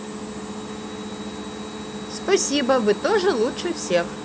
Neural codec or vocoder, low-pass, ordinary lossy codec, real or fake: none; none; none; real